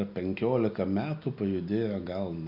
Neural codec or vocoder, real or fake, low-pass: none; real; 5.4 kHz